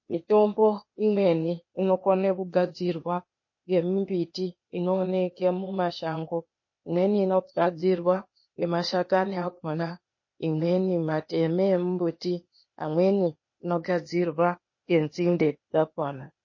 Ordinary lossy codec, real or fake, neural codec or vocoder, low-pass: MP3, 32 kbps; fake; codec, 16 kHz, 0.8 kbps, ZipCodec; 7.2 kHz